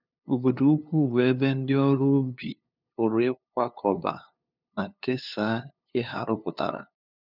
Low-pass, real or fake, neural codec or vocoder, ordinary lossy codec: 5.4 kHz; fake; codec, 16 kHz, 2 kbps, FunCodec, trained on LibriTTS, 25 frames a second; none